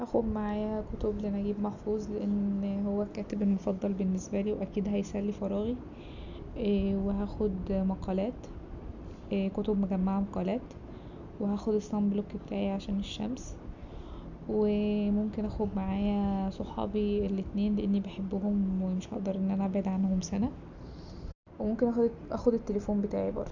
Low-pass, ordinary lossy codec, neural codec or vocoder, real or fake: 7.2 kHz; none; none; real